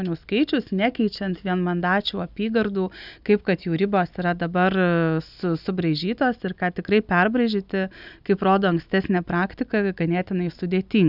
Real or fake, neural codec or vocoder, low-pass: real; none; 5.4 kHz